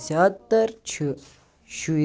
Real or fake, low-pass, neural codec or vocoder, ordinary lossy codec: real; none; none; none